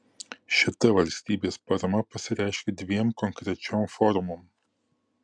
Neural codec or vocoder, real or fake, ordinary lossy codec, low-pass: none; real; MP3, 96 kbps; 9.9 kHz